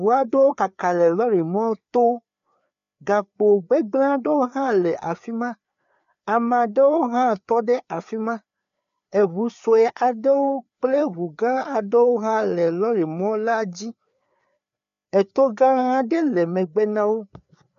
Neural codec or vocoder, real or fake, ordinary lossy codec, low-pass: codec, 16 kHz, 4 kbps, FreqCodec, larger model; fake; MP3, 96 kbps; 7.2 kHz